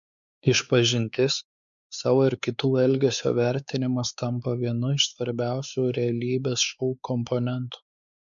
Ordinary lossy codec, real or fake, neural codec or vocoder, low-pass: MP3, 96 kbps; fake; codec, 16 kHz, 4 kbps, X-Codec, WavLM features, trained on Multilingual LibriSpeech; 7.2 kHz